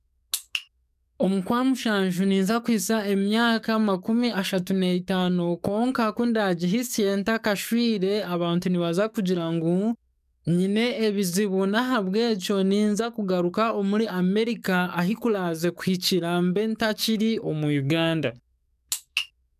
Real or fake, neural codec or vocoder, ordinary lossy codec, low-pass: fake; codec, 44.1 kHz, 7.8 kbps, DAC; none; 14.4 kHz